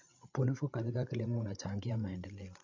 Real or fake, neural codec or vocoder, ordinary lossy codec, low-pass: fake; codec, 16 kHz, 8 kbps, FreqCodec, larger model; MP3, 48 kbps; 7.2 kHz